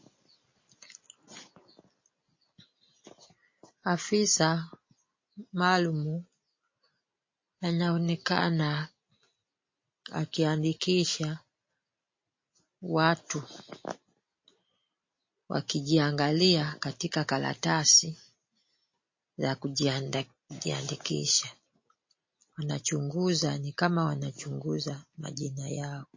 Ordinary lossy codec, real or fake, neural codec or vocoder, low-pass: MP3, 32 kbps; real; none; 7.2 kHz